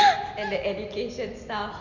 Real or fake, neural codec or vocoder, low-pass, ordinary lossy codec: real; none; 7.2 kHz; none